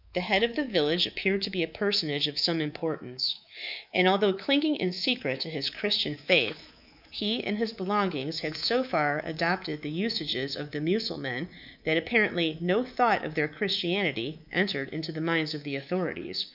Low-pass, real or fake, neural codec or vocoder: 5.4 kHz; fake; codec, 24 kHz, 3.1 kbps, DualCodec